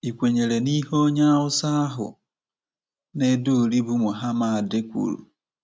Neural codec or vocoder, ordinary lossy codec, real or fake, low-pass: none; none; real; none